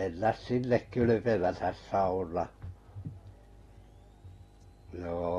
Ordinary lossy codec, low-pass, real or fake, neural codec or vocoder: AAC, 32 kbps; 19.8 kHz; real; none